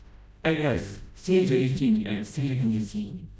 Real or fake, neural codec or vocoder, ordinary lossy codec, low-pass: fake; codec, 16 kHz, 0.5 kbps, FreqCodec, smaller model; none; none